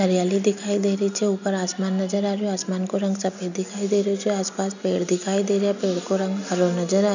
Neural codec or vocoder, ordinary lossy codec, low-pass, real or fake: none; none; 7.2 kHz; real